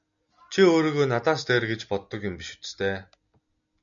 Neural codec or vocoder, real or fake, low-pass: none; real; 7.2 kHz